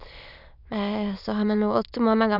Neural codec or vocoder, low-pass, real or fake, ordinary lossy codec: autoencoder, 22.05 kHz, a latent of 192 numbers a frame, VITS, trained on many speakers; 5.4 kHz; fake; none